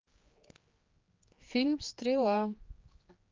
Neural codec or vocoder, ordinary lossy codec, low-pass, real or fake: codec, 16 kHz, 4 kbps, X-Codec, HuBERT features, trained on general audio; Opus, 32 kbps; 7.2 kHz; fake